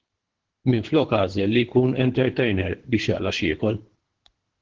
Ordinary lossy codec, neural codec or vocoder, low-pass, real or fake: Opus, 16 kbps; codec, 24 kHz, 3 kbps, HILCodec; 7.2 kHz; fake